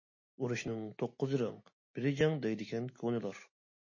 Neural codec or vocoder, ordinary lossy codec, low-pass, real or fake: none; MP3, 32 kbps; 7.2 kHz; real